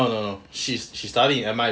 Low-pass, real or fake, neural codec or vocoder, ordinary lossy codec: none; real; none; none